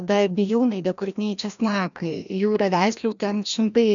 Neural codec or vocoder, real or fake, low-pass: codec, 16 kHz, 1 kbps, FreqCodec, larger model; fake; 7.2 kHz